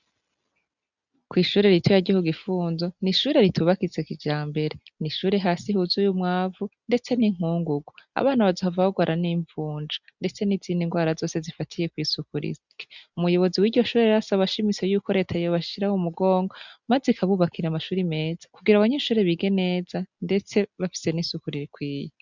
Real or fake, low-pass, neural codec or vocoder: real; 7.2 kHz; none